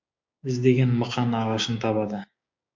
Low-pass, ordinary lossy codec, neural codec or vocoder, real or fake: 7.2 kHz; MP3, 48 kbps; codec, 16 kHz, 6 kbps, DAC; fake